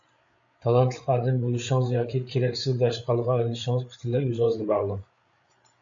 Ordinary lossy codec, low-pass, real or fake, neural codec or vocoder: AAC, 64 kbps; 7.2 kHz; fake; codec, 16 kHz, 8 kbps, FreqCodec, larger model